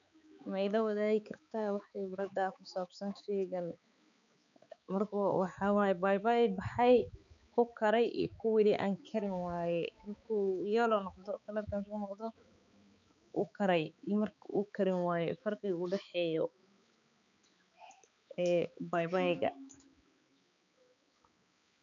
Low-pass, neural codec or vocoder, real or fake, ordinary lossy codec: 7.2 kHz; codec, 16 kHz, 4 kbps, X-Codec, HuBERT features, trained on balanced general audio; fake; none